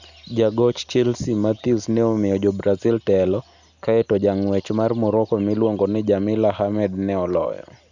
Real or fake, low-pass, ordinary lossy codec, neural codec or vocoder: real; 7.2 kHz; none; none